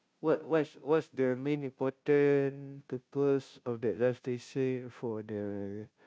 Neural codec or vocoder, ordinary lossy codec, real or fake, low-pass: codec, 16 kHz, 0.5 kbps, FunCodec, trained on Chinese and English, 25 frames a second; none; fake; none